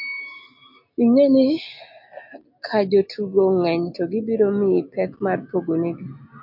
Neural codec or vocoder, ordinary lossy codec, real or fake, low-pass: none; MP3, 48 kbps; real; 5.4 kHz